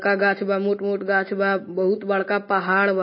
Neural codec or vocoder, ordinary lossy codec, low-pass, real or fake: none; MP3, 24 kbps; 7.2 kHz; real